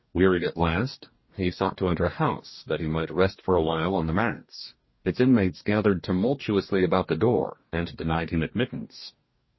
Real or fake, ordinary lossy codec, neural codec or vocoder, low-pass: fake; MP3, 24 kbps; codec, 44.1 kHz, 2.6 kbps, DAC; 7.2 kHz